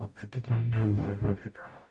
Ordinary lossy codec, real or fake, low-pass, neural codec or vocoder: none; fake; 10.8 kHz; codec, 44.1 kHz, 0.9 kbps, DAC